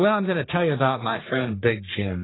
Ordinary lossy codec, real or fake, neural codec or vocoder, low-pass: AAC, 16 kbps; fake; codec, 44.1 kHz, 3.4 kbps, Pupu-Codec; 7.2 kHz